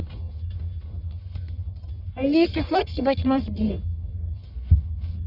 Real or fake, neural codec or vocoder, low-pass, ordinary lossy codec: fake; codec, 44.1 kHz, 1.7 kbps, Pupu-Codec; 5.4 kHz; none